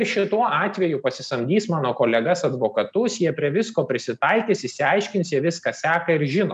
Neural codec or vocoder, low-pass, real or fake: none; 9.9 kHz; real